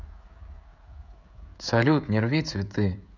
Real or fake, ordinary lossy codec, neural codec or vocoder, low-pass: fake; none; codec, 16 kHz, 16 kbps, FreqCodec, smaller model; 7.2 kHz